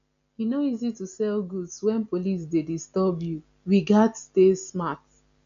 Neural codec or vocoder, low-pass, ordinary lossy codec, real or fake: none; 7.2 kHz; none; real